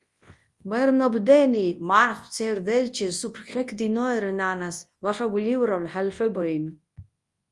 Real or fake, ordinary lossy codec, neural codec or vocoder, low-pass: fake; Opus, 32 kbps; codec, 24 kHz, 0.9 kbps, WavTokenizer, large speech release; 10.8 kHz